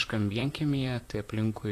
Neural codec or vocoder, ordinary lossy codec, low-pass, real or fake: vocoder, 44.1 kHz, 128 mel bands, Pupu-Vocoder; AAC, 64 kbps; 14.4 kHz; fake